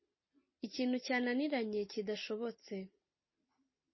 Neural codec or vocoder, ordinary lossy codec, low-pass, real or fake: none; MP3, 24 kbps; 7.2 kHz; real